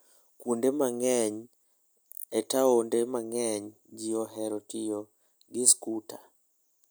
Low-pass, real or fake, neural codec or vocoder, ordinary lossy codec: none; real; none; none